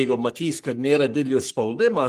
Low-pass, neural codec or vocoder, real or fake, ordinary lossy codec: 14.4 kHz; codec, 44.1 kHz, 3.4 kbps, Pupu-Codec; fake; Opus, 24 kbps